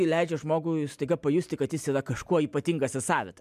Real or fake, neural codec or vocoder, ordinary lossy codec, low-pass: real; none; MP3, 96 kbps; 14.4 kHz